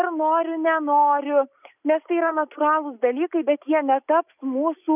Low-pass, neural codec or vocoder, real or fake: 3.6 kHz; none; real